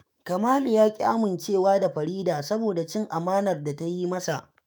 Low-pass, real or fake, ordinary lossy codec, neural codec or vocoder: none; fake; none; autoencoder, 48 kHz, 128 numbers a frame, DAC-VAE, trained on Japanese speech